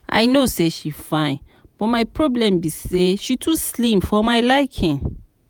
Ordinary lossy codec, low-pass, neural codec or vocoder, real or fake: none; none; vocoder, 48 kHz, 128 mel bands, Vocos; fake